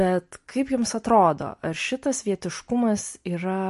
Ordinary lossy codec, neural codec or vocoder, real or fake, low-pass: MP3, 48 kbps; none; real; 14.4 kHz